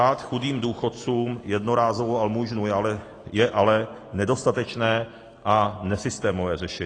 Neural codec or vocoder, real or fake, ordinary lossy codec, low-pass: none; real; AAC, 32 kbps; 9.9 kHz